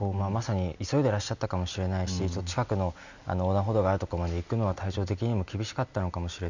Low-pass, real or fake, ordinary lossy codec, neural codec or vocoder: 7.2 kHz; real; none; none